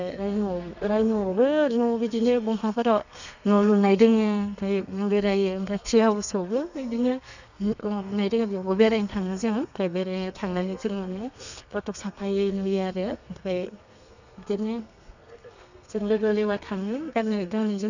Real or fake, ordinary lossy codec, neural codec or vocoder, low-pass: fake; none; codec, 32 kHz, 1.9 kbps, SNAC; 7.2 kHz